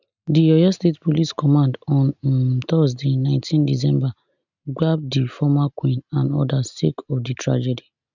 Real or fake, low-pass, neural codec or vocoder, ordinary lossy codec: real; 7.2 kHz; none; none